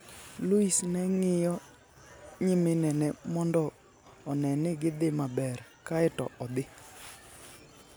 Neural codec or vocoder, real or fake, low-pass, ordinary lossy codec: none; real; none; none